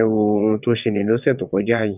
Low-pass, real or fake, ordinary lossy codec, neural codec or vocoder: 3.6 kHz; fake; none; vocoder, 22.05 kHz, 80 mel bands, WaveNeXt